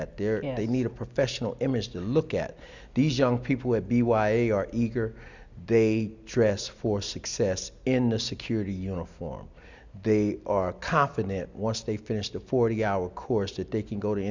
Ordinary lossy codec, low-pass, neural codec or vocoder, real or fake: Opus, 64 kbps; 7.2 kHz; none; real